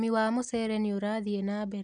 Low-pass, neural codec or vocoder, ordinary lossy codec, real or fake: 9.9 kHz; none; none; real